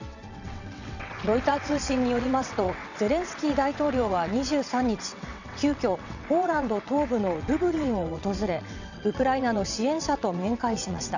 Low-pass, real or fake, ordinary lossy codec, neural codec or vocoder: 7.2 kHz; fake; none; vocoder, 22.05 kHz, 80 mel bands, WaveNeXt